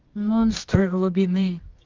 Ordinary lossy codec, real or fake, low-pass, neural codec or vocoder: Opus, 32 kbps; fake; 7.2 kHz; codec, 24 kHz, 0.9 kbps, WavTokenizer, medium music audio release